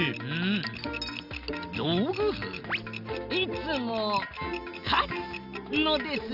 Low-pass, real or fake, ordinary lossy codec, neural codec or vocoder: 5.4 kHz; real; Opus, 64 kbps; none